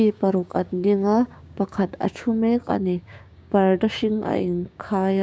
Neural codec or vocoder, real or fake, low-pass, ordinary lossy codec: codec, 16 kHz, 6 kbps, DAC; fake; none; none